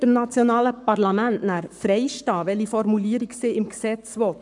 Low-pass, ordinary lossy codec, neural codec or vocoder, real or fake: 10.8 kHz; none; codec, 44.1 kHz, 7.8 kbps, Pupu-Codec; fake